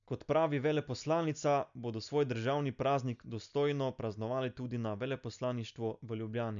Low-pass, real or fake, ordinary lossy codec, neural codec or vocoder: 7.2 kHz; real; none; none